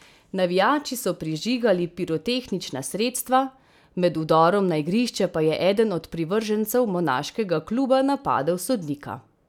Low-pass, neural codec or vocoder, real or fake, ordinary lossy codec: 19.8 kHz; none; real; none